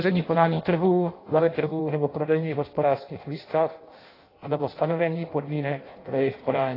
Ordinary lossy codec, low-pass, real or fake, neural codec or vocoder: AAC, 24 kbps; 5.4 kHz; fake; codec, 16 kHz in and 24 kHz out, 0.6 kbps, FireRedTTS-2 codec